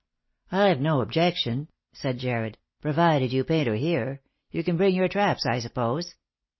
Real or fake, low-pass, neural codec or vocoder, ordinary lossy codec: real; 7.2 kHz; none; MP3, 24 kbps